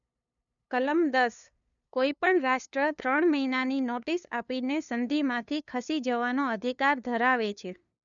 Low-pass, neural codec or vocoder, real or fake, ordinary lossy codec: 7.2 kHz; codec, 16 kHz, 2 kbps, FunCodec, trained on LibriTTS, 25 frames a second; fake; none